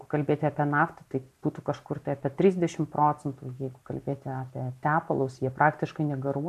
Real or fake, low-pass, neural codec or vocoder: real; 14.4 kHz; none